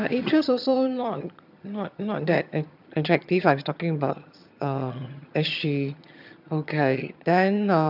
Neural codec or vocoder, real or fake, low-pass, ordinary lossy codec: vocoder, 22.05 kHz, 80 mel bands, HiFi-GAN; fake; 5.4 kHz; none